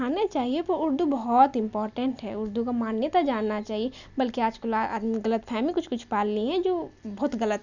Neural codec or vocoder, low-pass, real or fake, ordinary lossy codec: none; 7.2 kHz; real; none